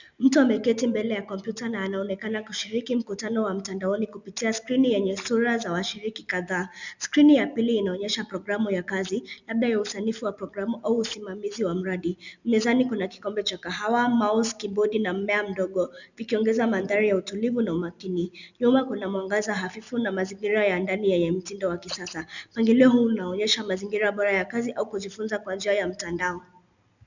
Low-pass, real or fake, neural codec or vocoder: 7.2 kHz; real; none